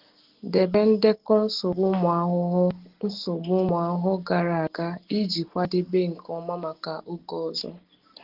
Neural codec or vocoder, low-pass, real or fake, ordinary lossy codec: none; 5.4 kHz; real; Opus, 16 kbps